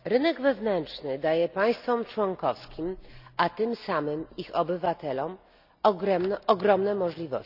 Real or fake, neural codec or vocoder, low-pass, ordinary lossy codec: real; none; 5.4 kHz; none